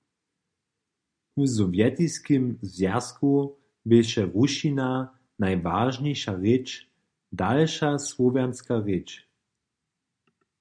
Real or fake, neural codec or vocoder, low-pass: real; none; 9.9 kHz